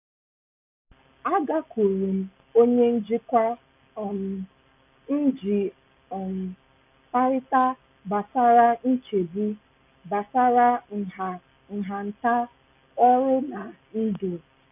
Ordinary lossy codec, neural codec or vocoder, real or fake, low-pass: none; none; real; 3.6 kHz